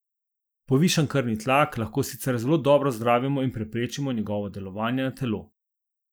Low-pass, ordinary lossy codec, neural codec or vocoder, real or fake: none; none; none; real